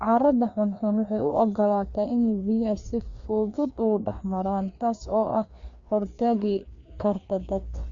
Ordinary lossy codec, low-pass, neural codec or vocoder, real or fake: Opus, 64 kbps; 7.2 kHz; codec, 16 kHz, 2 kbps, FreqCodec, larger model; fake